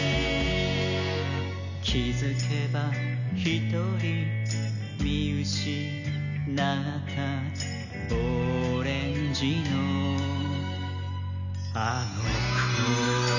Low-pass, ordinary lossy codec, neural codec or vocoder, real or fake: 7.2 kHz; none; none; real